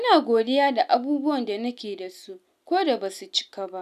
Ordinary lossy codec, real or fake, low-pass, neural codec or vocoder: none; real; 14.4 kHz; none